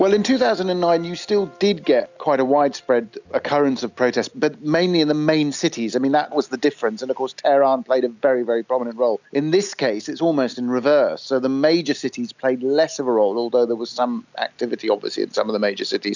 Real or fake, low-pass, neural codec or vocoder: real; 7.2 kHz; none